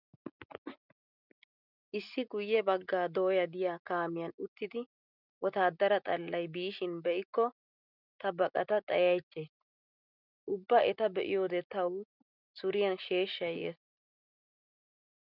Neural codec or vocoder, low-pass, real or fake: none; 5.4 kHz; real